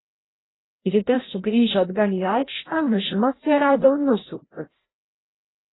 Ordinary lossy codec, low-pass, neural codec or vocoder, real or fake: AAC, 16 kbps; 7.2 kHz; codec, 16 kHz, 0.5 kbps, FreqCodec, larger model; fake